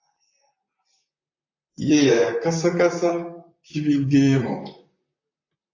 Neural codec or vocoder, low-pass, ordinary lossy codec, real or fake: vocoder, 44.1 kHz, 128 mel bands, Pupu-Vocoder; 7.2 kHz; AAC, 48 kbps; fake